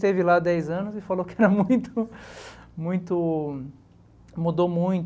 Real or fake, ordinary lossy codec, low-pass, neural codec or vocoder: real; none; none; none